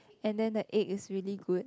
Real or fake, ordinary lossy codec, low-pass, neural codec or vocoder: real; none; none; none